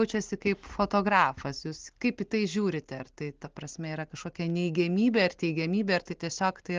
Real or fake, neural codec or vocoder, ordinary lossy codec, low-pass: real; none; Opus, 16 kbps; 7.2 kHz